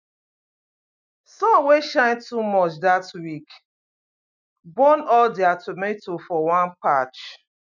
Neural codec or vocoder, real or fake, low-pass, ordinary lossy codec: none; real; 7.2 kHz; none